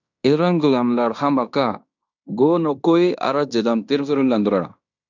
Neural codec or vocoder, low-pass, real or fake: codec, 16 kHz in and 24 kHz out, 0.9 kbps, LongCat-Audio-Codec, fine tuned four codebook decoder; 7.2 kHz; fake